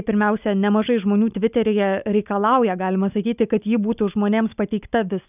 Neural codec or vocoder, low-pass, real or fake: none; 3.6 kHz; real